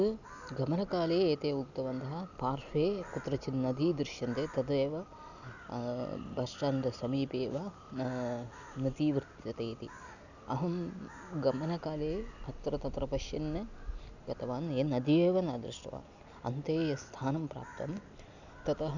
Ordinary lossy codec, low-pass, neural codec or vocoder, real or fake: none; 7.2 kHz; none; real